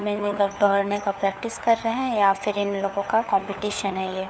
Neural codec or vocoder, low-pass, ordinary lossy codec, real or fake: codec, 16 kHz, 4 kbps, FunCodec, trained on LibriTTS, 50 frames a second; none; none; fake